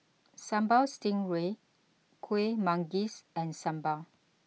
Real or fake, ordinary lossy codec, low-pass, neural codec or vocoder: real; none; none; none